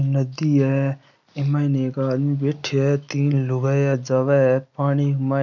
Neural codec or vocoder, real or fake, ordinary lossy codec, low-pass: none; real; none; 7.2 kHz